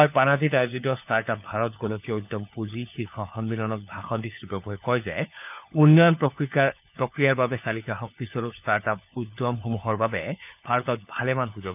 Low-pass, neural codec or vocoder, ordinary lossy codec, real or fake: 3.6 kHz; codec, 16 kHz, 4 kbps, FunCodec, trained on LibriTTS, 50 frames a second; none; fake